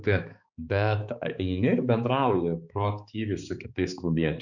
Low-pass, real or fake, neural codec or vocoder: 7.2 kHz; fake; codec, 16 kHz, 4 kbps, X-Codec, HuBERT features, trained on balanced general audio